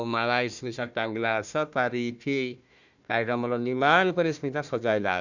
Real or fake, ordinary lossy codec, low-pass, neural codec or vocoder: fake; none; 7.2 kHz; codec, 16 kHz, 1 kbps, FunCodec, trained on Chinese and English, 50 frames a second